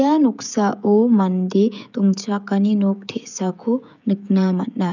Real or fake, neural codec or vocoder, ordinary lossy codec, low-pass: fake; codec, 16 kHz, 16 kbps, FreqCodec, smaller model; none; 7.2 kHz